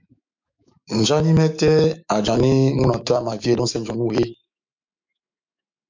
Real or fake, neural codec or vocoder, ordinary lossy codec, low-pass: fake; autoencoder, 48 kHz, 128 numbers a frame, DAC-VAE, trained on Japanese speech; MP3, 64 kbps; 7.2 kHz